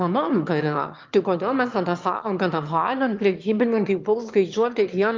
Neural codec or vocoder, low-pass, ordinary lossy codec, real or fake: autoencoder, 22.05 kHz, a latent of 192 numbers a frame, VITS, trained on one speaker; 7.2 kHz; Opus, 32 kbps; fake